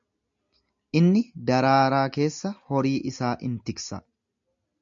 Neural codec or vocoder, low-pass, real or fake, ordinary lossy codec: none; 7.2 kHz; real; AAC, 64 kbps